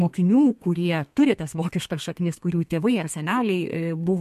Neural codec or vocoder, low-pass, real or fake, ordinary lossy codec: codec, 32 kHz, 1.9 kbps, SNAC; 14.4 kHz; fake; MP3, 64 kbps